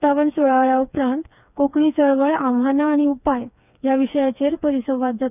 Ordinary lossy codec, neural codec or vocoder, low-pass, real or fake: none; codec, 16 kHz, 4 kbps, FreqCodec, smaller model; 3.6 kHz; fake